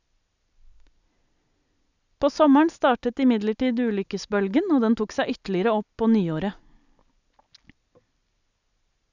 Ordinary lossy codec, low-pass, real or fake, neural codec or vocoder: none; 7.2 kHz; real; none